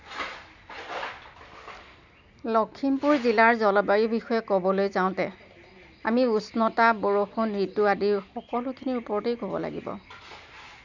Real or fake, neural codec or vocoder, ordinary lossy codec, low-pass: real; none; none; 7.2 kHz